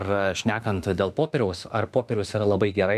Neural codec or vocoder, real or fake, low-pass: autoencoder, 48 kHz, 32 numbers a frame, DAC-VAE, trained on Japanese speech; fake; 14.4 kHz